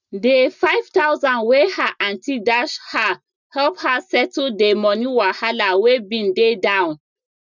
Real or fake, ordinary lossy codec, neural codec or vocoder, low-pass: real; none; none; 7.2 kHz